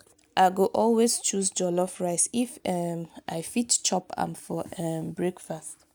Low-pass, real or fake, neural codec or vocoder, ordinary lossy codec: none; real; none; none